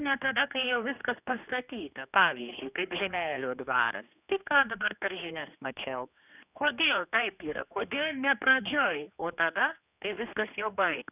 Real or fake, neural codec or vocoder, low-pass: fake; codec, 16 kHz, 2 kbps, X-Codec, HuBERT features, trained on general audio; 3.6 kHz